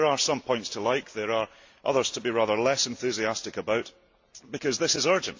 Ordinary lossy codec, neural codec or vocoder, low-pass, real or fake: AAC, 48 kbps; none; 7.2 kHz; real